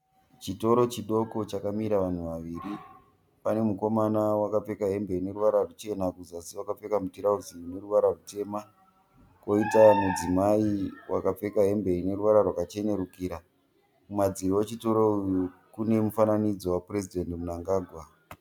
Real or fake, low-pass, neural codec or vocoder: real; 19.8 kHz; none